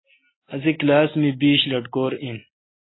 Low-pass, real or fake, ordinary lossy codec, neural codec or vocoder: 7.2 kHz; real; AAC, 16 kbps; none